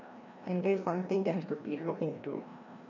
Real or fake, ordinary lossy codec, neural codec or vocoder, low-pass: fake; none; codec, 16 kHz, 1 kbps, FreqCodec, larger model; 7.2 kHz